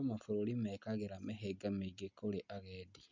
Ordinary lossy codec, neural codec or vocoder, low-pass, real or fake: MP3, 64 kbps; none; 7.2 kHz; real